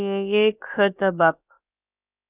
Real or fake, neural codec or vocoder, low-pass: fake; codec, 16 kHz, about 1 kbps, DyCAST, with the encoder's durations; 3.6 kHz